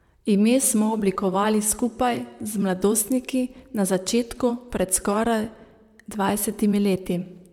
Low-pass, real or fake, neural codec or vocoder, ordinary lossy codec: 19.8 kHz; fake; vocoder, 44.1 kHz, 128 mel bands, Pupu-Vocoder; none